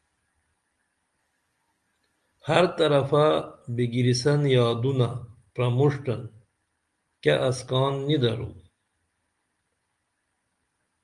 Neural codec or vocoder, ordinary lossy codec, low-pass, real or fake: none; Opus, 32 kbps; 10.8 kHz; real